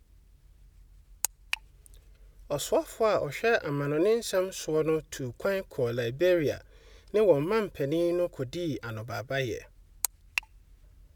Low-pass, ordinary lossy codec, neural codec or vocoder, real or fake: 19.8 kHz; none; none; real